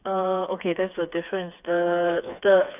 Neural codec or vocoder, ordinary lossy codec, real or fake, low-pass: codec, 16 kHz, 4 kbps, FreqCodec, smaller model; none; fake; 3.6 kHz